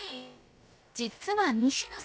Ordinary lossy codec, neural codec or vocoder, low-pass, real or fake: none; codec, 16 kHz, about 1 kbps, DyCAST, with the encoder's durations; none; fake